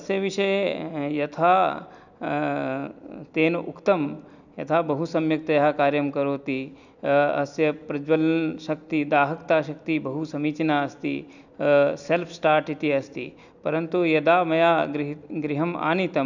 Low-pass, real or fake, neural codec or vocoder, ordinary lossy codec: 7.2 kHz; real; none; none